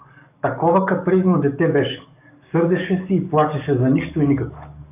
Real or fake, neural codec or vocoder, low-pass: fake; codec, 44.1 kHz, 7.8 kbps, DAC; 3.6 kHz